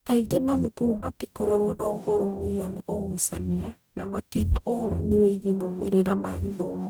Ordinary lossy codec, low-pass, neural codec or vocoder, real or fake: none; none; codec, 44.1 kHz, 0.9 kbps, DAC; fake